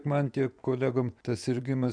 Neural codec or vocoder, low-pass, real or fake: vocoder, 24 kHz, 100 mel bands, Vocos; 9.9 kHz; fake